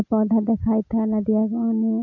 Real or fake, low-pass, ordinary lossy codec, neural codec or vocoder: real; 7.2 kHz; none; none